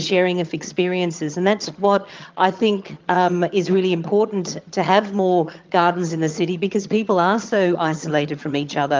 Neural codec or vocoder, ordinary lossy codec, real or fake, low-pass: vocoder, 22.05 kHz, 80 mel bands, HiFi-GAN; Opus, 24 kbps; fake; 7.2 kHz